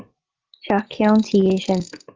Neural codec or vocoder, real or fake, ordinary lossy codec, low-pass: none; real; Opus, 16 kbps; 7.2 kHz